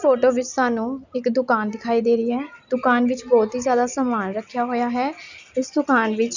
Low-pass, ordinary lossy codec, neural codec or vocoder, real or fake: 7.2 kHz; none; none; real